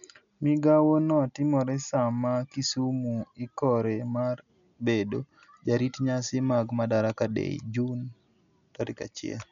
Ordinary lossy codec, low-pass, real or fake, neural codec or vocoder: none; 7.2 kHz; real; none